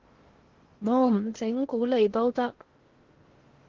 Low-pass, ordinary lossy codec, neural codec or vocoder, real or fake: 7.2 kHz; Opus, 16 kbps; codec, 16 kHz in and 24 kHz out, 0.8 kbps, FocalCodec, streaming, 65536 codes; fake